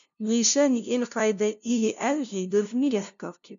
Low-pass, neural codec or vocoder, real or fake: 7.2 kHz; codec, 16 kHz, 0.5 kbps, FunCodec, trained on LibriTTS, 25 frames a second; fake